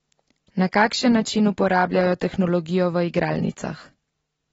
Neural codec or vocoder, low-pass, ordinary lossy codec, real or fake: none; 10.8 kHz; AAC, 24 kbps; real